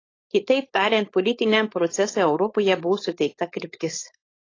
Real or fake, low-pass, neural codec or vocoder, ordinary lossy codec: fake; 7.2 kHz; codec, 16 kHz, 4.8 kbps, FACodec; AAC, 32 kbps